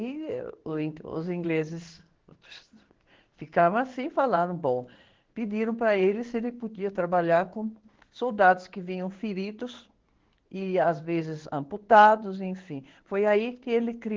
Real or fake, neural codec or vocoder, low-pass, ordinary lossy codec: fake; codec, 16 kHz in and 24 kHz out, 1 kbps, XY-Tokenizer; 7.2 kHz; Opus, 16 kbps